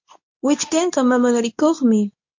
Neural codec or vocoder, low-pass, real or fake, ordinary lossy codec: codec, 24 kHz, 0.9 kbps, WavTokenizer, medium speech release version 2; 7.2 kHz; fake; MP3, 48 kbps